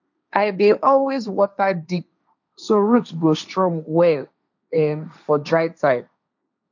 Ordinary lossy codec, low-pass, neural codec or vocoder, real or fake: none; 7.2 kHz; codec, 16 kHz, 1.1 kbps, Voila-Tokenizer; fake